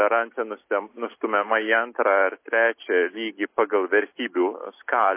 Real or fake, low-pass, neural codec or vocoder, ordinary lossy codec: real; 3.6 kHz; none; MP3, 24 kbps